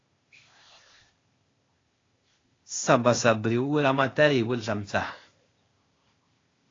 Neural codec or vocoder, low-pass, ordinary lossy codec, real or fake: codec, 16 kHz, 0.8 kbps, ZipCodec; 7.2 kHz; AAC, 32 kbps; fake